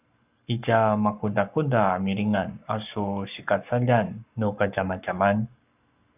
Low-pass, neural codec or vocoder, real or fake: 3.6 kHz; codec, 44.1 kHz, 7.8 kbps, Pupu-Codec; fake